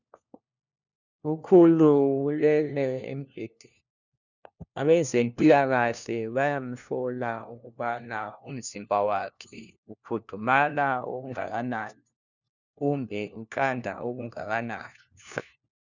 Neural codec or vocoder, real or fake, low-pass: codec, 16 kHz, 1 kbps, FunCodec, trained on LibriTTS, 50 frames a second; fake; 7.2 kHz